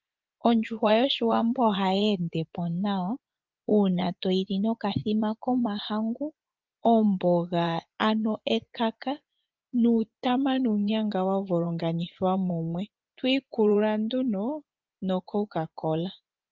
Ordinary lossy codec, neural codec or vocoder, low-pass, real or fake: Opus, 24 kbps; vocoder, 24 kHz, 100 mel bands, Vocos; 7.2 kHz; fake